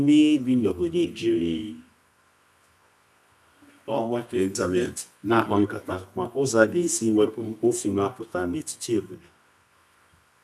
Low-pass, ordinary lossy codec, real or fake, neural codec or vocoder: none; none; fake; codec, 24 kHz, 0.9 kbps, WavTokenizer, medium music audio release